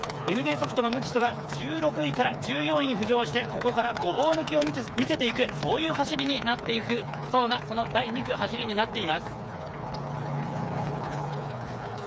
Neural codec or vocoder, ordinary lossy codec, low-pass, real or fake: codec, 16 kHz, 4 kbps, FreqCodec, smaller model; none; none; fake